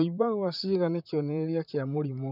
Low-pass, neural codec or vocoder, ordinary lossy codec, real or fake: 5.4 kHz; vocoder, 44.1 kHz, 128 mel bands, Pupu-Vocoder; none; fake